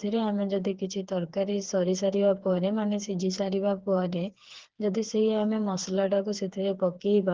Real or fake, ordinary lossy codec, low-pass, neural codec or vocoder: fake; Opus, 16 kbps; 7.2 kHz; codec, 16 kHz, 4 kbps, FreqCodec, smaller model